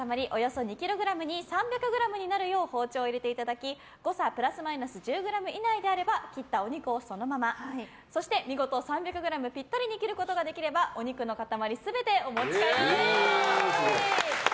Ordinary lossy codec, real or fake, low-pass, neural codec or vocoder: none; real; none; none